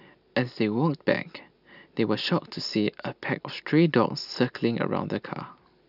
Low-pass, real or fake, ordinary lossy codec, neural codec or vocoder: 5.4 kHz; real; none; none